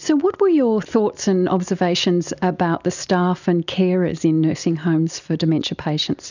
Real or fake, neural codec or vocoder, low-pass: real; none; 7.2 kHz